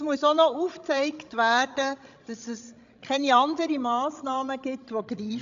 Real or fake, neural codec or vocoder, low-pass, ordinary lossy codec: fake; codec, 16 kHz, 16 kbps, FreqCodec, larger model; 7.2 kHz; AAC, 64 kbps